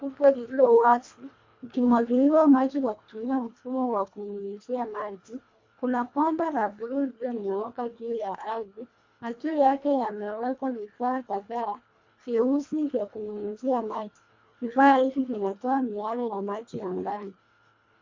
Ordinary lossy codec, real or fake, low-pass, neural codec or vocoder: MP3, 48 kbps; fake; 7.2 kHz; codec, 24 kHz, 1.5 kbps, HILCodec